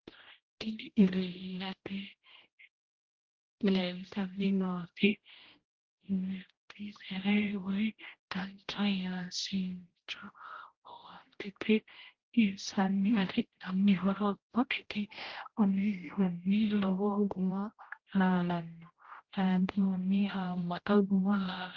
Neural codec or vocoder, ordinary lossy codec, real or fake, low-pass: codec, 16 kHz, 0.5 kbps, X-Codec, HuBERT features, trained on general audio; Opus, 16 kbps; fake; 7.2 kHz